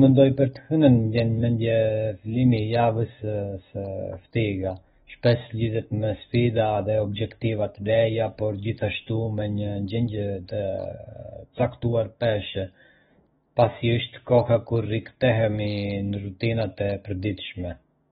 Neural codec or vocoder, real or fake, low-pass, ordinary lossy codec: autoencoder, 48 kHz, 128 numbers a frame, DAC-VAE, trained on Japanese speech; fake; 19.8 kHz; AAC, 16 kbps